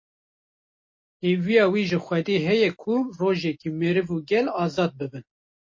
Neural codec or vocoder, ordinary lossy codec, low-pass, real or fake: none; MP3, 32 kbps; 7.2 kHz; real